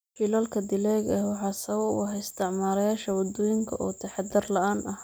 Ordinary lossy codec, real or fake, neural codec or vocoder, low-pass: none; real; none; none